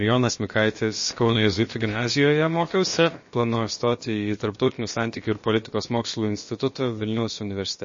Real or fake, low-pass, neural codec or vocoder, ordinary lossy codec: fake; 7.2 kHz; codec, 16 kHz, about 1 kbps, DyCAST, with the encoder's durations; MP3, 32 kbps